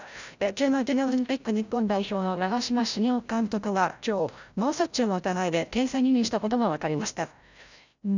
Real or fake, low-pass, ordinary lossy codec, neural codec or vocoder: fake; 7.2 kHz; none; codec, 16 kHz, 0.5 kbps, FreqCodec, larger model